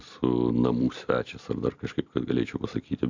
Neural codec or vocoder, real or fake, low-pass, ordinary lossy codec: vocoder, 44.1 kHz, 128 mel bands every 256 samples, BigVGAN v2; fake; 7.2 kHz; MP3, 64 kbps